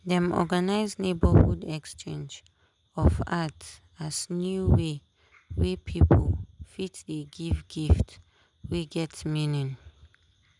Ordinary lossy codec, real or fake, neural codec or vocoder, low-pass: none; real; none; 10.8 kHz